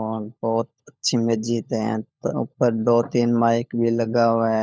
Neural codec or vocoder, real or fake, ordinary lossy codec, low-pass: codec, 16 kHz, 16 kbps, FunCodec, trained on LibriTTS, 50 frames a second; fake; none; none